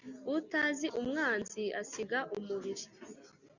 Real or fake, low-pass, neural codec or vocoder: real; 7.2 kHz; none